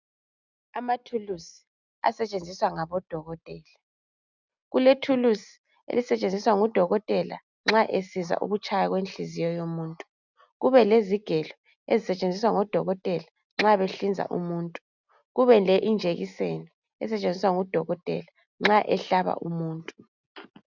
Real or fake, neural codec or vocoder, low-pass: real; none; 7.2 kHz